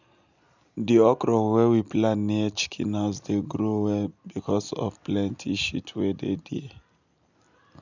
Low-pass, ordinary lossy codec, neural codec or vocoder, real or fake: 7.2 kHz; none; none; real